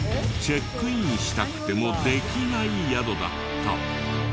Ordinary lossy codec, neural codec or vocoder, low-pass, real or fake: none; none; none; real